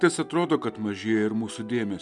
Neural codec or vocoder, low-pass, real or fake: none; 10.8 kHz; real